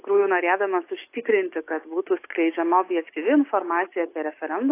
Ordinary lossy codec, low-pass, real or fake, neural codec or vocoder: AAC, 24 kbps; 3.6 kHz; real; none